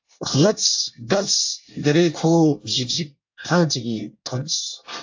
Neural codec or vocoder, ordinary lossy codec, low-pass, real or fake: codec, 24 kHz, 1 kbps, SNAC; AAC, 48 kbps; 7.2 kHz; fake